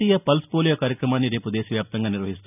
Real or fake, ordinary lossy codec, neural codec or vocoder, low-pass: real; none; none; 3.6 kHz